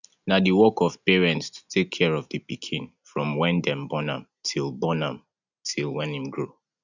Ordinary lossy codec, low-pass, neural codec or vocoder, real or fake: none; 7.2 kHz; none; real